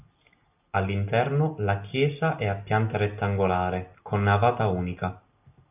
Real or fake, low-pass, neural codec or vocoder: real; 3.6 kHz; none